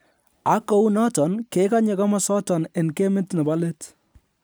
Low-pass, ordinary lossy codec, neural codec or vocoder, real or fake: none; none; none; real